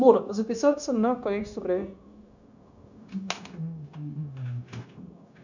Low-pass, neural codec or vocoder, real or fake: 7.2 kHz; codec, 16 kHz, 0.9 kbps, LongCat-Audio-Codec; fake